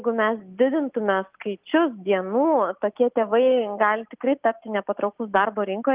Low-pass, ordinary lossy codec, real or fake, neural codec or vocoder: 3.6 kHz; Opus, 24 kbps; real; none